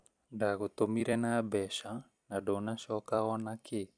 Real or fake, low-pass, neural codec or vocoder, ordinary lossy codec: fake; 9.9 kHz; vocoder, 44.1 kHz, 128 mel bands every 256 samples, BigVGAN v2; none